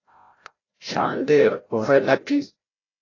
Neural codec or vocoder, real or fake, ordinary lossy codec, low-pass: codec, 16 kHz, 0.5 kbps, FreqCodec, larger model; fake; AAC, 32 kbps; 7.2 kHz